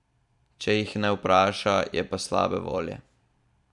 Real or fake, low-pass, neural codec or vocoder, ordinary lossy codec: fake; 10.8 kHz; vocoder, 44.1 kHz, 128 mel bands every 256 samples, BigVGAN v2; none